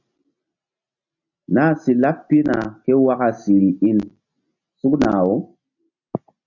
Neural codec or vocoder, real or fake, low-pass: none; real; 7.2 kHz